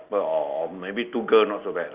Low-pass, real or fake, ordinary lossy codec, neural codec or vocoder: 3.6 kHz; real; Opus, 24 kbps; none